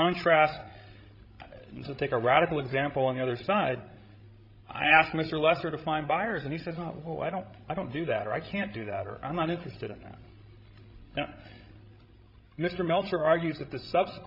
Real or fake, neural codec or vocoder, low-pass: fake; codec, 16 kHz, 16 kbps, FreqCodec, larger model; 5.4 kHz